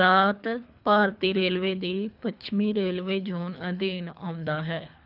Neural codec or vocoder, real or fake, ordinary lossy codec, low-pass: codec, 24 kHz, 3 kbps, HILCodec; fake; none; 5.4 kHz